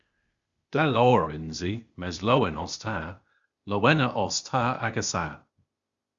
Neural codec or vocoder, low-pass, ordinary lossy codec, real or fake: codec, 16 kHz, 0.8 kbps, ZipCodec; 7.2 kHz; Opus, 64 kbps; fake